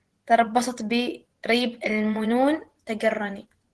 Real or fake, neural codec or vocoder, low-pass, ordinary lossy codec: fake; vocoder, 24 kHz, 100 mel bands, Vocos; 10.8 kHz; Opus, 16 kbps